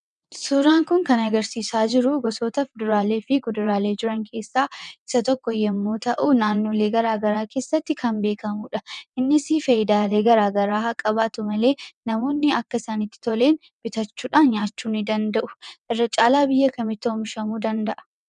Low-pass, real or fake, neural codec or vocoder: 9.9 kHz; fake; vocoder, 22.05 kHz, 80 mel bands, WaveNeXt